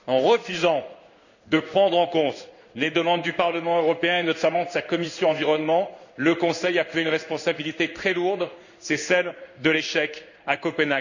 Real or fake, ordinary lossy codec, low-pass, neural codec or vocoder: fake; none; 7.2 kHz; codec, 16 kHz in and 24 kHz out, 1 kbps, XY-Tokenizer